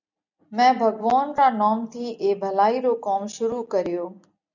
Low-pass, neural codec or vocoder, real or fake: 7.2 kHz; none; real